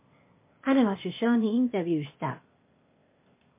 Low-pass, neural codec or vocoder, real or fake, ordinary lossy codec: 3.6 kHz; codec, 16 kHz, 0.8 kbps, ZipCodec; fake; MP3, 24 kbps